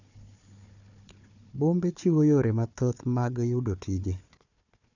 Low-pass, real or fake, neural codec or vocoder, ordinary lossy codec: 7.2 kHz; fake; codec, 16 kHz, 4 kbps, FunCodec, trained on Chinese and English, 50 frames a second; none